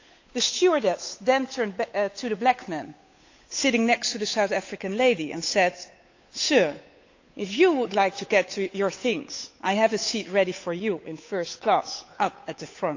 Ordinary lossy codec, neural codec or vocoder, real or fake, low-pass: AAC, 48 kbps; codec, 16 kHz, 8 kbps, FunCodec, trained on Chinese and English, 25 frames a second; fake; 7.2 kHz